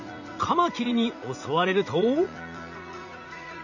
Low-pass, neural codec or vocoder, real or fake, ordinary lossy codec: 7.2 kHz; vocoder, 44.1 kHz, 80 mel bands, Vocos; fake; none